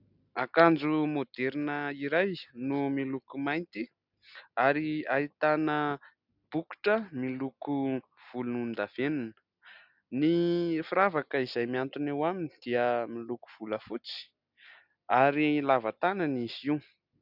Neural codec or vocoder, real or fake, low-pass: none; real; 5.4 kHz